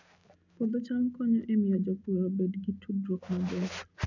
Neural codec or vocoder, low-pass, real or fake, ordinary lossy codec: none; 7.2 kHz; real; none